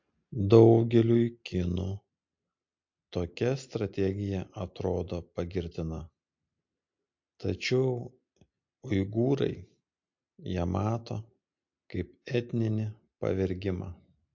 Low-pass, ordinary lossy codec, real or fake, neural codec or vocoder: 7.2 kHz; MP3, 48 kbps; real; none